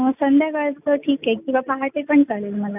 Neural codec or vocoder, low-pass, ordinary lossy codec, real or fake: none; 3.6 kHz; AAC, 32 kbps; real